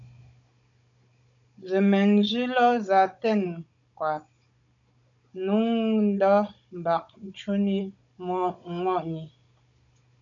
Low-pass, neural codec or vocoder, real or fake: 7.2 kHz; codec, 16 kHz, 16 kbps, FunCodec, trained on Chinese and English, 50 frames a second; fake